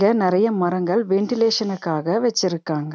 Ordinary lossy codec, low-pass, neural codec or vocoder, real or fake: none; none; none; real